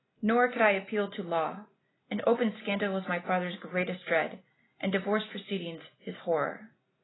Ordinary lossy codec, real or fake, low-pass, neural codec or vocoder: AAC, 16 kbps; real; 7.2 kHz; none